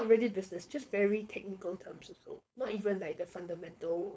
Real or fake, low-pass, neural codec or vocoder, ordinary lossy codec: fake; none; codec, 16 kHz, 4.8 kbps, FACodec; none